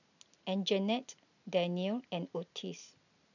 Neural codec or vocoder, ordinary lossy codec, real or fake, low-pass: none; none; real; 7.2 kHz